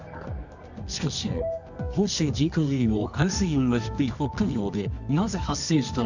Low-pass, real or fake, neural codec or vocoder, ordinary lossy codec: 7.2 kHz; fake; codec, 24 kHz, 0.9 kbps, WavTokenizer, medium music audio release; none